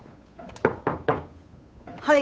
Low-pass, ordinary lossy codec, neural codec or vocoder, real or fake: none; none; codec, 16 kHz, 8 kbps, FunCodec, trained on Chinese and English, 25 frames a second; fake